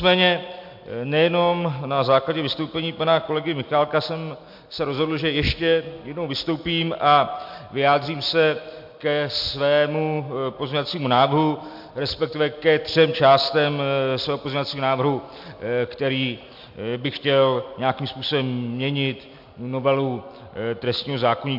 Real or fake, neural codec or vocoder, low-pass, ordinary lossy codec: real; none; 5.4 kHz; MP3, 48 kbps